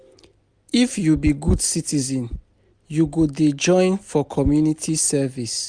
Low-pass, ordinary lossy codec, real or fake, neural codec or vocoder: 9.9 kHz; Opus, 64 kbps; real; none